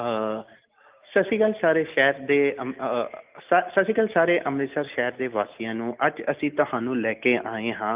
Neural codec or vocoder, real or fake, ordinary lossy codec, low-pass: none; real; Opus, 24 kbps; 3.6 kHz